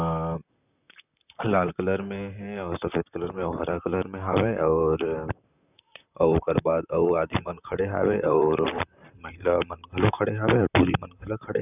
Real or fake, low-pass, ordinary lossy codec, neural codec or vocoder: fake; 3.6 kHz; none; vocoder, 44.1 kHz, 128 mel bands, Pupu-Vocoder